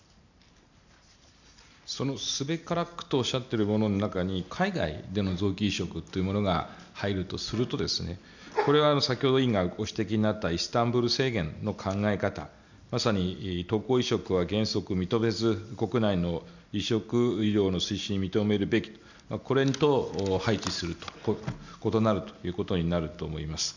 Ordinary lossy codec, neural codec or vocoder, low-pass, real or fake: none; none; 7.2 kHz; real